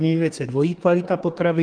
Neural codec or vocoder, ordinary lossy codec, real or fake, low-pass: codec, 24 kHz, 1 kbps, SNAC; Opus, 32 kbps; fake; 9.9 kHz